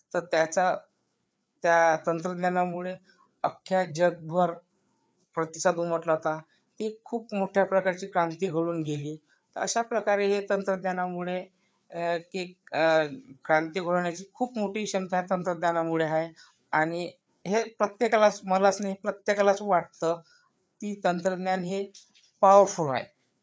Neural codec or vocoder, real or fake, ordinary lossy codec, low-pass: codec, 16 kHz, 4 kbps, FreqCodec, larger model; fake; none; none